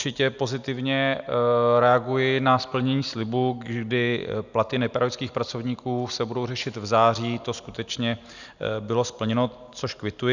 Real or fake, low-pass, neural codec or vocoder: real; 7.2 kHz; none